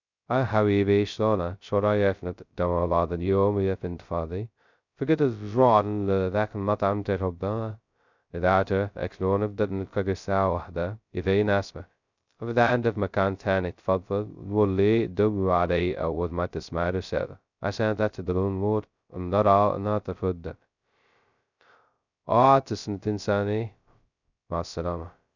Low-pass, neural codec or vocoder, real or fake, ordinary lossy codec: 7.2 kHz; codec, 16 kHz, 0.2 kbps, FocalCodec; fake; none